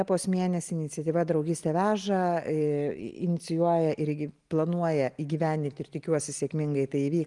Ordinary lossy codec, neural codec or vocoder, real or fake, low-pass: Opus, 24 kbps; none; real; 10.8 kHz